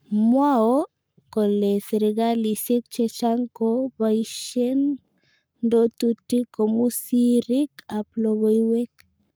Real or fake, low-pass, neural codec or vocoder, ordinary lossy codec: fake; none; codec, 44.1 kHz, 7.8 kbps, Pupu-Codec; none